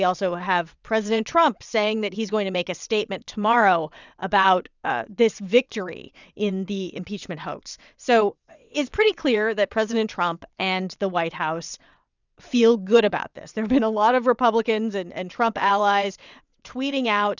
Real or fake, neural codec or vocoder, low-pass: fake; vocoder, 22.05 kHz, 80 mel bands, WaveNeXt; 7.2 kHz